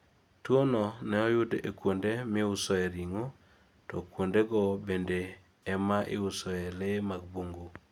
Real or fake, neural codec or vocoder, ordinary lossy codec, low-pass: real; none; none; 19.8 kHz